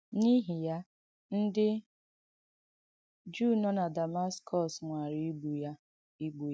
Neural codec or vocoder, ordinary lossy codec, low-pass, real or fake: none; none; none; real